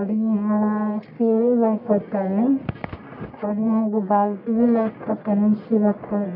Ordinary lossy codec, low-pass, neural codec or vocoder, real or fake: none; 5.4 kHz; codec, 44.1 kHz, 1.7 kbps, Pupu-Codec; fake